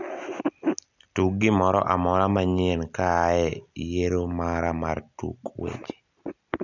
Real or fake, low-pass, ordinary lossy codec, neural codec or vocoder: real; 7.2 kHz; none; none